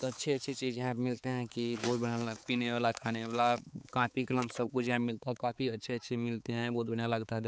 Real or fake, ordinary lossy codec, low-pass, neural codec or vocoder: fake; none; none; codec, 16 kHz, 4 kbps, X-Codec, HuBERT features, trained on balanced general audio